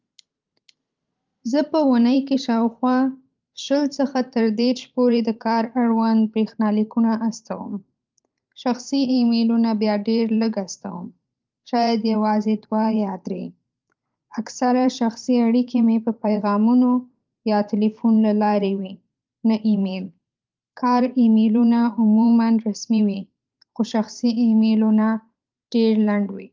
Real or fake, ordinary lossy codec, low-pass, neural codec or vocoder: fake; Opus, 24 kbps; 7.2 kHz; vocoder, 44.1 kHz, 128 mel bands every 512 samples, BigVGAN v2